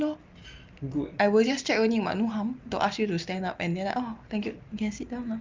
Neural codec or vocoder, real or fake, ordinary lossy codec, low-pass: none; real; Opus, 24 kbps; 7.2 kHz